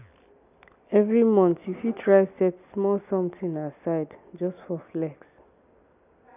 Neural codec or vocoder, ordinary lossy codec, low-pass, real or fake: none; none; 3.6 kHz; real